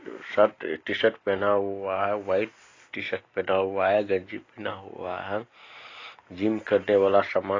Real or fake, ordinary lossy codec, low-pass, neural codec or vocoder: real; AAC, 32 kbps; 7.2 kHz; none